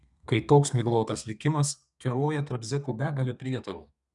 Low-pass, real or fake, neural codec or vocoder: 10.8 kHz; fake; codec, 32 kHz, 1.9 kbps, SNAC